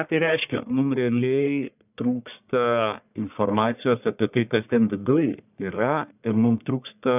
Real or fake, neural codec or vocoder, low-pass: fake; codec, 44.1 kHz, 1.7 kbps, Pupu-Codec; 3.6 kHz